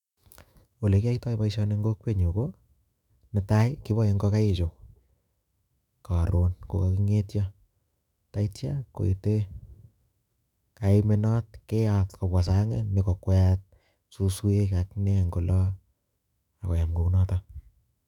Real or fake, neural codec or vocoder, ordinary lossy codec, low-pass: fake; autoencoder, 48 kHz, 128 numbers a frame, DAC-VAE, trained on Japanese speech; none; 19.8 kHz